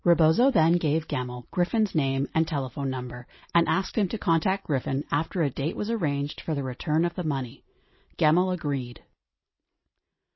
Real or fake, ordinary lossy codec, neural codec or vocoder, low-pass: real; MP3, 24 kbps; none; 7.2 kHz